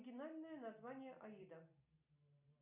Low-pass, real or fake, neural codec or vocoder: 3.6 kHz; real; none